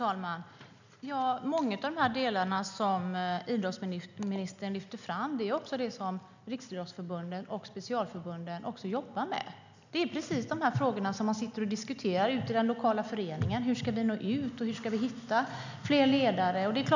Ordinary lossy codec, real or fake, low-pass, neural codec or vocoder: none; real; 7.2 kHz; none